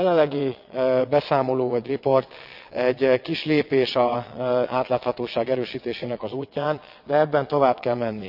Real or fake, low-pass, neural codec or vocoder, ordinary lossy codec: fake; 5.4 kHz; vocoder, 22.05 kHz, 80 mel bands, WaveNeXt; none